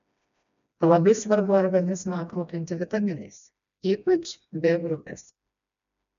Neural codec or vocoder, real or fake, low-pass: codec, 16 kHz, 1 kbps, FreqCodec, smaller model; fake; 7.2 kHz